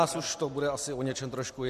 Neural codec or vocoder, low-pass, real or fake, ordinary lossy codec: none; 14.4 kHz; real; MP3, 64 kbps